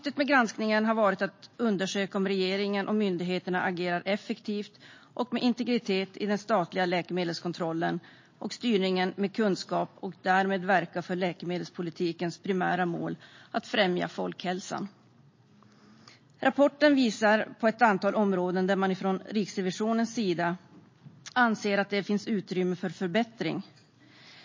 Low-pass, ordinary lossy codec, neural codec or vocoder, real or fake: 7.2 kHz; MP3, 32 kbps; none; real